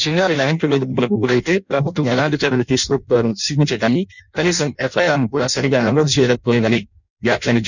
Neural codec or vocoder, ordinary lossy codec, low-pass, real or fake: codec, 16 kHz in and 24 kHz out, 0.6 kbps, FireRedTTS-2 codec; none; 7.2 kHz; fake